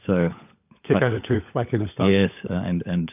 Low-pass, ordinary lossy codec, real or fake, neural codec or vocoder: 3.6 kHz; AAC, 32 kbps; fake; codec, 16 kHz, 16 kbps, FunCodec, trained on LibriTTS, 50 frames a second